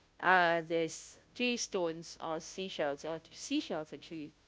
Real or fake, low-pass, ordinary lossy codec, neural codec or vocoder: fake; none; none; codec, 16 kHz, 0.5 kbps, FunCodec, trained on Chinese and English, 25 frames a second